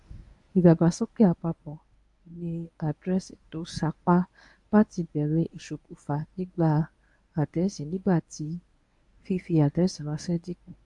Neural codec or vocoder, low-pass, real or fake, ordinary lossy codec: codec, 24 kHz, 0.9 kbps, WavTokenizer, medium speech release version 1; 10.8 kHz; fake; AAC, 64 kbps